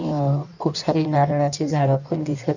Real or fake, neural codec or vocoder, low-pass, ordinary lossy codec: fake; codec, 16 kHz in and 24 kHz out, 1.1 kbps, FireRedTTS-2 codec; 7.2 kHz; none